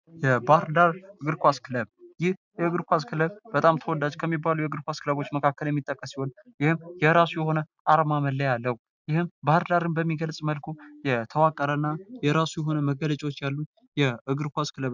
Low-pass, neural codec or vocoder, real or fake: 7.2 kHz; none; real